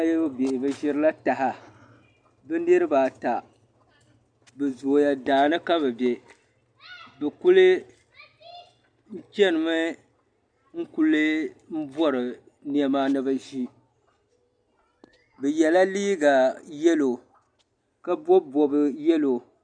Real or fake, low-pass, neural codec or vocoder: real; 9.9 kHz; none